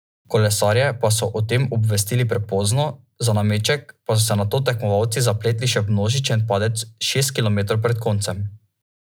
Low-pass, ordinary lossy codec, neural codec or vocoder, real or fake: none; none; none; real